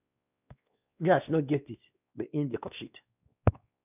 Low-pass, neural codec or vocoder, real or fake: 3.6 kHz; codec, 16 kHz, 2 kbps, X-Codec, WavLM features, trained on Multilingual LibriSpeech; fake